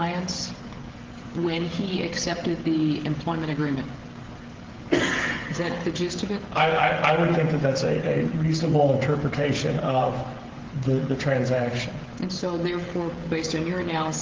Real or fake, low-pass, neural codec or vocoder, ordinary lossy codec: fake; 7.2 kHz; codec, 16 kHz, 16 kbps, FreqCodec, smaller model; Opus, 16 kbps